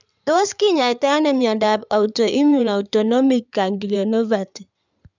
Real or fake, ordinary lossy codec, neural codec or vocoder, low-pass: fake; none; codec, 16 kHz in and 24 kHz out, 2.2 kbps, FireRedTTS-2 codec; 7.2 kHz